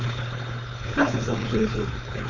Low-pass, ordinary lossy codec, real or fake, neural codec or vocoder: 7.2 kHz; none; fake; codec, 16 kHz, 4.8 kbps, FACodec